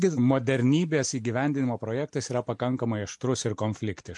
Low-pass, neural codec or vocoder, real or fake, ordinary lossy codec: 10.8 kHz; none; real; MP3, 64 kbps